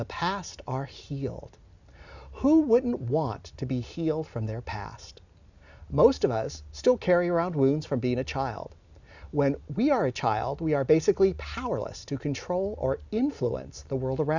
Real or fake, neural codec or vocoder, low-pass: real; none; 7.2 kHz